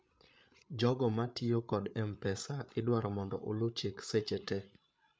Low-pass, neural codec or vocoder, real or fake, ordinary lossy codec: none; codec, 16 kHz, 8 kbps, FreqCodec, larger model; fake; none